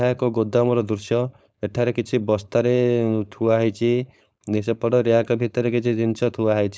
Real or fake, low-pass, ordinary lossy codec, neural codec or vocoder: fake; none; none; codec, 16 kHz, 4.8 kbps, FACodec